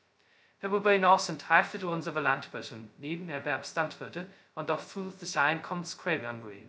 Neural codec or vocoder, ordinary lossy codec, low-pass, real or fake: codec, 16 kHz, 0.2 kbps, FocalCodec; none; none; fake